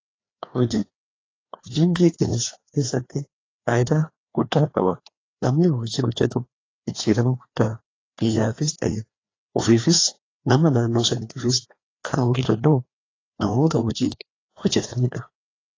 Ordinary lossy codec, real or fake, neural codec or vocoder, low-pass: AAC, 32 kbps; fake; codec, 16 kHz, 2 kbps, FreqCodec, larger model; 7.2 kHz